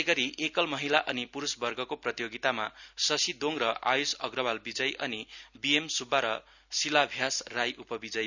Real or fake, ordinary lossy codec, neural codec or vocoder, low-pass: real; none; none; 7.2 kHz